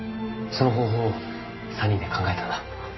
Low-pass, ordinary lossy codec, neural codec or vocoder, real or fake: 7.2 kHz; MP3, 24 kbps; none; real